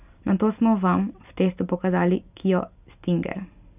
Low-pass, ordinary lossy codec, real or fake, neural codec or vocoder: 3.6 kHz; none; real; none